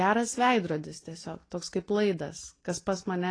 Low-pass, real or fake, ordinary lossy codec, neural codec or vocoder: 9.9 kHz; real; AAC, 32 kbps; none